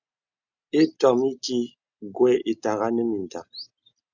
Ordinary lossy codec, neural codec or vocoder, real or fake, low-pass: Opus, 64 kbps; none; real; 7.2 kHz